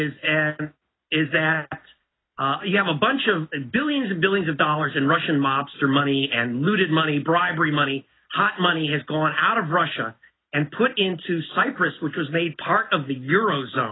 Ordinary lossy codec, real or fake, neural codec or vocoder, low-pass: AAC, 16 kbps; real; none; 7.2 kHz